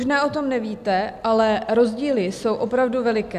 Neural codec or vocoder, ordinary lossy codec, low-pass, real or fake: none; MP3, 96 kbps; 14.4 kHz; real